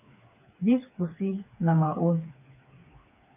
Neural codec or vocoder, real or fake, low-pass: codec, 16 kHz, 4 kbps, FreqCodec, smaller model; fake; 3.6 kHz